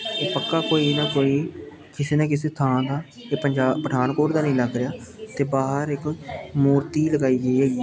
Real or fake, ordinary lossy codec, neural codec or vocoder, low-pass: real; none; none; none